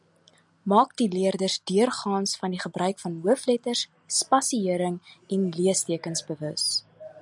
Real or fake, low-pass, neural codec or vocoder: real; 10.8 kHz; none